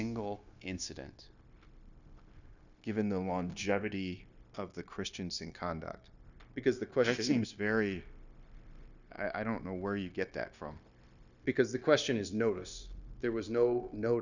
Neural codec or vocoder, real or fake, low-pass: codec, 16 kHz, 0.9 kbps, LongCat-Audio-Codec; fake; 7.2 kHz